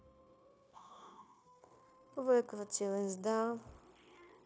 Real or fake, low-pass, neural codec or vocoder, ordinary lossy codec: fake; none; codec, 16 kHz, 0.9 kbps, LongCat-Audio-Codec; none